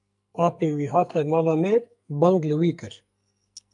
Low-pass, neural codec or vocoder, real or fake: 10.8 kHz; codec, 44.1 kHz, 2.6 kbps, SNAC; fake